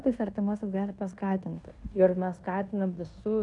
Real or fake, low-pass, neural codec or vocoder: fake; 10.8 kHz; codec, 16 kHz in and 24 kHz out, 0.9 kbps, LongCat-Audio-Codec, fine tuned four codebook decoder